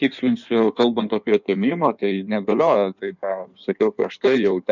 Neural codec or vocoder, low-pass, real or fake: codec, 16 kHz in and 24 kHz out, 1.1 kbps, FireRedTTS-2 codec; 7.2 kHz; fake